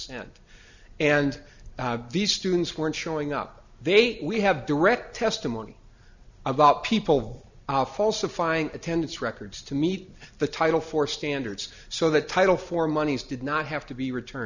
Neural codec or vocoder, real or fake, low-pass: none; real; 7.2 kHz